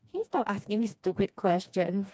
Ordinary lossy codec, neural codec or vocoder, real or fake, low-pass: none; codec, 16 kHz, 2 kbps, FreqCodec, smaller model; fake; none